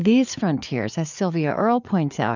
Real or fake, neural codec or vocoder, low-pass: fake; codec, 16 kHz, 4 kbps, FreqCodec, larger model; 7.2 kHz